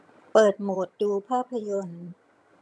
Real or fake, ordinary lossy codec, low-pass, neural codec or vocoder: fake; none; none; vocoder, 22.05 kHz, 80 mel bands, HiFi-GAN